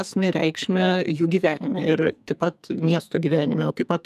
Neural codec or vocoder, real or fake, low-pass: codec, 44.1 kHz, 2.6 kbps, SNAC; fake; 14.4 kHz